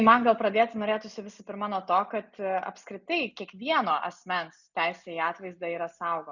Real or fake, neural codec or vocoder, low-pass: real; none; 7.2 kHz